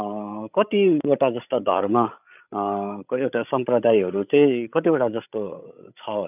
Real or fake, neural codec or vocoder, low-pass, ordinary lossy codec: fake; codec, 16 kHz, 16 kbps, FreqCodec, larger model; 3.6 kHz; none